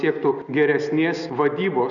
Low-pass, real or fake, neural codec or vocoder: 7.2 kHz; real; none